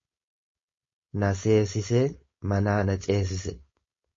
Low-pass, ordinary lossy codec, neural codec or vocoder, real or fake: 7.2 kHz; MP3, 32 kbps; codec, 16 kHz, 4.8 kbps, FACodec; fake